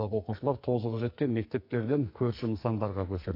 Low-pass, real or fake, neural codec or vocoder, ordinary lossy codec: 5.4 kHz; fake; codec, 44.1 kHz, 2.6 kbps, SNAC; AAC, 32 kbps